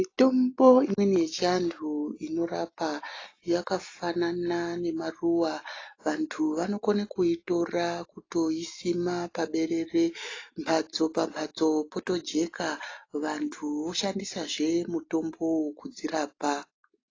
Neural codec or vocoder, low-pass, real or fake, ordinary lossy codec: none; 7.2 kHz; real; AAC, 32 kbps